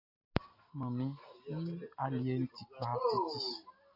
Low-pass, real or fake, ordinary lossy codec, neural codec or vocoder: 5.4 kHz; real; AAC, 48 kbps; none